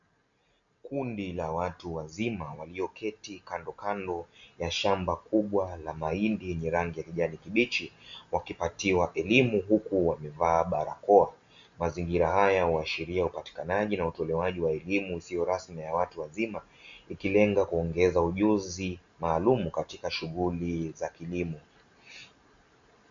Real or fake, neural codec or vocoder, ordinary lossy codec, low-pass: real; none; AAC, 64 kbps; 7.2 kHz